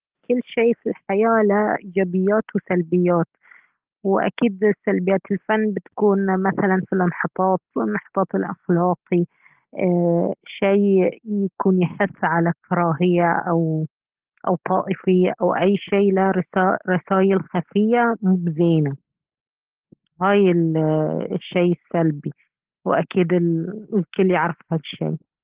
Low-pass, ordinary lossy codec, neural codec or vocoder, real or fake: 3.6 kHz; Opus, 16 kbps; none; real